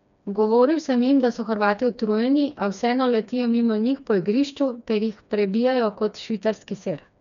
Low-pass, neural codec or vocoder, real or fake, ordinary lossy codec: 7.2 kHz; codec, 16 kHz, 2 kbps, FreqCodec, smaller model; fake; none